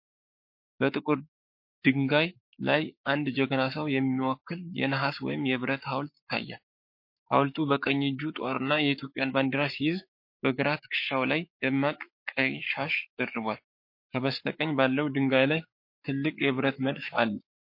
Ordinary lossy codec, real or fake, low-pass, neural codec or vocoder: MP3, 32 kbps; fake; 5.4 kHz; codec, 44.1 kHz, 7.8 kbps, Pupu-Codec